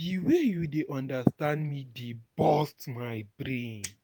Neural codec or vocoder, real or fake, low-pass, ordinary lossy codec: vocoder, 48 kHz, 128 mel bands, Vocos; fake; none; none